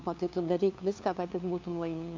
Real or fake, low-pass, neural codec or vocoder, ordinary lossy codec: fake; 7.2 kHz; codec, 16 kHz, 2 kbps, FunCodec, trained on LibriTTS, 25 frames a second; MP3, 64 kbps